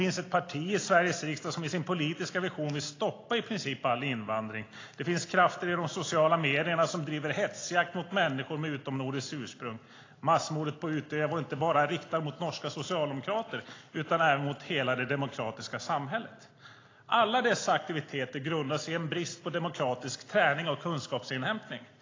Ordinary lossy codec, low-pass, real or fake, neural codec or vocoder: AAC, 32 kbps; 7.2 kHz; real; none